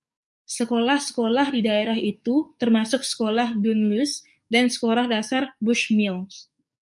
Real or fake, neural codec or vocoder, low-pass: fake; codec, 44.1 kHz, 7.8 kbps, DAC; 10.8 kHz